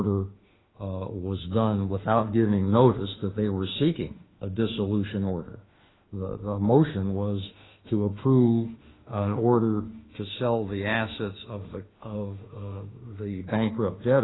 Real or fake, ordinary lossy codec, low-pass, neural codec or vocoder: fake; AAC, 16 kbps; 7.2 kHz; autoencoder, 48 kHz, 32 numbers a frame, DAC-VAE, trained on Japanese speech